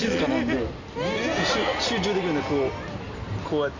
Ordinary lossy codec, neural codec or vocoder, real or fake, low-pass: none; none; real; 7.2 kHz